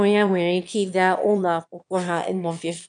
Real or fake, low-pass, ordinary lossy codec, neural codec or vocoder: fake; 9.9 kHz; none; autoencoder, 22.05 kHz, a latent of 192 numbers a frame, VITS, trained on one speaker